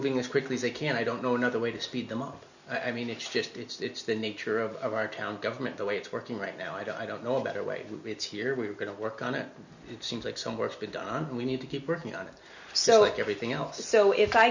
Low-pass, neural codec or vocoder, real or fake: 7.2 kHz; none; real